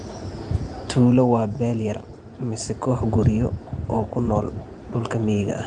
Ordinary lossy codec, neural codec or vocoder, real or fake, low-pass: Opus, 32 kbps; vocoder, 44.1 kHz, 128 mel bands every 512 samples, BigVGAN v2; fake; 10.8 kHz